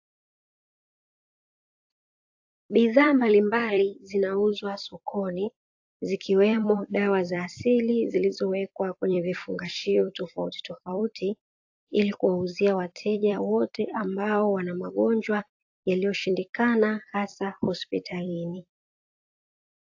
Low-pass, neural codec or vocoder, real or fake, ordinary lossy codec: 7.2 kHz; vocoder, 44.1 kHz, 128 mel bands every 256 samples, BigVGAN v2; fake; AAC, 48 kbps